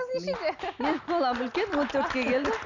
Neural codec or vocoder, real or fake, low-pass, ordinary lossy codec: none; real; 7.2 kHz; none